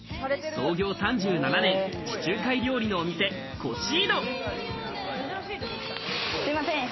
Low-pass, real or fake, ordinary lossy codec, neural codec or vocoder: 7.2 kHz; real; MP3, 24 kbps; none